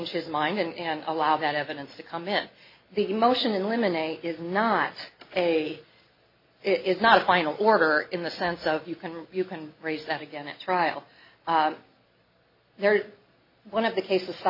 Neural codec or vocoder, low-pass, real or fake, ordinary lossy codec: none; 5.4 kHz; real; MP3, 24 kbps